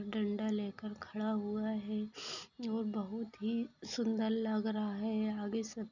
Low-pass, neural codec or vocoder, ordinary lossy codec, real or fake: 7.2 kHz; none; none; real